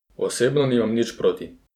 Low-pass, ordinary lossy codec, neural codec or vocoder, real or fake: 19.8 kHz; none; vocoder, 44.1 kHz, 128 mel bands every 512 samples, BigVGAN v2; fake